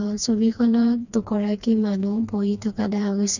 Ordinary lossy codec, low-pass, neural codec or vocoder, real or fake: none; 7.2 kHz; codec, 16 kHz, 2 kbps, FreqCodec, smaller model; fake